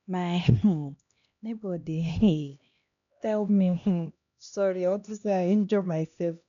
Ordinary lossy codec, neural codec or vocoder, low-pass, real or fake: none; codec, 16 kHz, 1 kbps, X-Codec, HuBERT features, trained on LibriSpeech; 7.2 kHz; fake